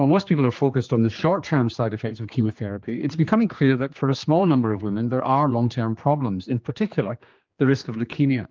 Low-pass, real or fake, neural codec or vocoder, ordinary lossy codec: 7.2 kHz; fake; codec, 16 kHz, 2 kbps, X-Codec, HuBERT features, trained on general audio; Opus, 32 kbps